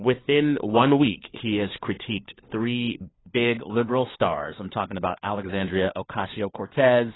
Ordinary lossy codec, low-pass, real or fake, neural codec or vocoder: AAC, 16 kbps; 7.2 kHz; fake; codec, 16 kHz, 4 kbps, FunCodec, trained on LibriTTS, 50 frames a second